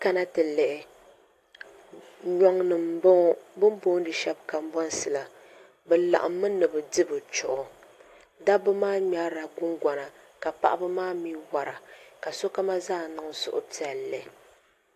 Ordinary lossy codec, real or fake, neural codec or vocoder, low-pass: AAC, 64 kbps; real; none; 14.4 kHz